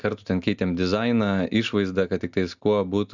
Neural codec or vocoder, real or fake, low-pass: none; real; 7.2 kHz